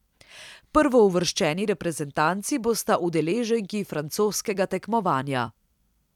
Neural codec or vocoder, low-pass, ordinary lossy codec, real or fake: vocoder, 44.1 kHz, 128 mel bands every 256 samples, BigVGAN v2; 19.8 kHz; none; fake